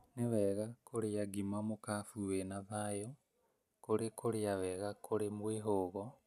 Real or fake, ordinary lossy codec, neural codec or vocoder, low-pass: real; none; none; 14.4 kHz